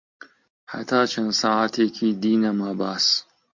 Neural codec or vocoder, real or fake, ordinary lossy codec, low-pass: none; real; MP3, 48 kbps; 7.2 kHz